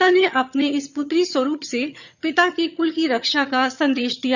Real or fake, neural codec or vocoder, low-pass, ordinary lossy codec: fake; vocoder, 22.05 kHz, 80 mel bands, HiFi-GAN; 7.2 kHz; none